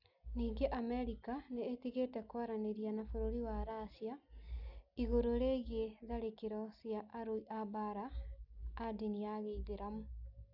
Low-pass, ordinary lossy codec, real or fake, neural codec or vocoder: 5.4 kHz; none; real; none